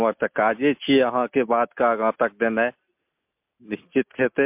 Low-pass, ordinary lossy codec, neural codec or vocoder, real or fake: 3.6 kHz; MP3, 32 kbps; none; real